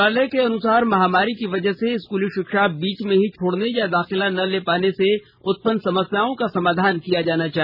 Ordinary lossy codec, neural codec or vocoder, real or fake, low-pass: none; none; real; 5.4 kHz